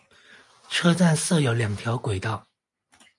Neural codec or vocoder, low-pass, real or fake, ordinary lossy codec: none; 10.8 kHz; real; MP3, 64 kbps